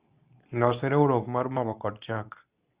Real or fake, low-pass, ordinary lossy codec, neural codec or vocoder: fake; 3.6 kHz; Opus, 64 kbps; codec, 24 kHz, 0.9 kbps, WavTokenizer, medium speech release version 2